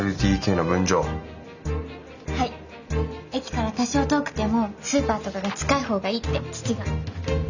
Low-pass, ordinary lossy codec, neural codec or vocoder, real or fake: 7.2 kHz; none; none; real